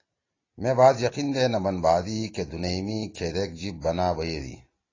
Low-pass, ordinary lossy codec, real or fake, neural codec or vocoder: 7.2 kHz; AAC, 32 kbps; real; none